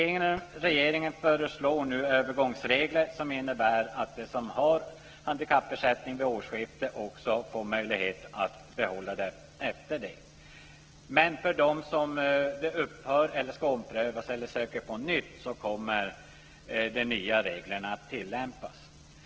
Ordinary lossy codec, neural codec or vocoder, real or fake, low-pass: Opus, 16 kbps; none; real; 7.2 kHz